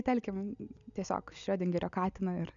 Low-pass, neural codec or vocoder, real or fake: 7.2 kHz; none; real